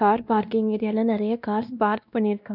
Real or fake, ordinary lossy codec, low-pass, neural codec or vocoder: fake; none; 5.4 kHz; codec, 16 kHz, 1 kbps, X-Codec, WavLM features, trained on Multilingual LibriSpeech